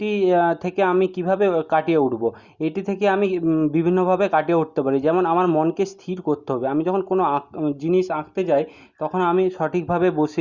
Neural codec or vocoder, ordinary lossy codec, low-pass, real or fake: none; Opus, 64 kbps; 7.2 kHz; real